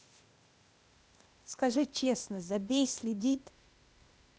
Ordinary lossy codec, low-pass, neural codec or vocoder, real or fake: none; none; codec, 16 kHz, 0.8 kbps, ZipCodec; fake